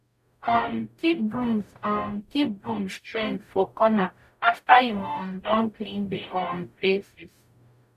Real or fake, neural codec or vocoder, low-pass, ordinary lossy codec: fake; codec, 44.1 kHz, 0.9 kbps, DAC; 14.4 kHz; none